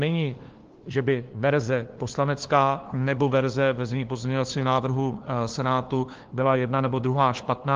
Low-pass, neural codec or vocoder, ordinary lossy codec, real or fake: 7.2 kHz; codec, 16 kHz, 2 kbps, FunCodec, trained on LibriTTS, 25 frames a second; Opus, 16 kbps; fake